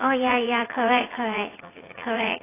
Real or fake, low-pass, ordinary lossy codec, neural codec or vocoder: fake; 3.6 kHz; AAC, 16 kbps; vocoder, 44.1 kHz, 80 mel bands, Vocos